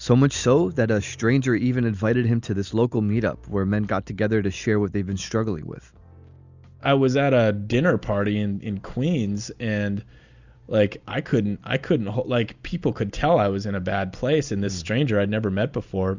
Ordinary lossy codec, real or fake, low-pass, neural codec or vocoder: Opus, 64 kbps; real; 7.2 kHz; none